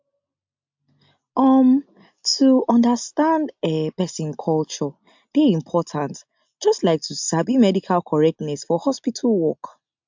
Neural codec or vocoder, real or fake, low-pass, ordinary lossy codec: none; real; 7.2 kHz; MP3, 64 kbps